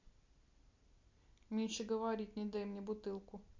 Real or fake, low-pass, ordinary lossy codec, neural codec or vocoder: real; 7.2 kHz; MP3, 48 kbps; none